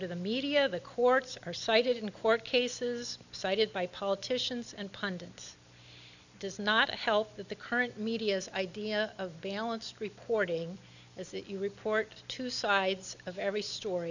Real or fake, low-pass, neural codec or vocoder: real; 7.2 kHz; none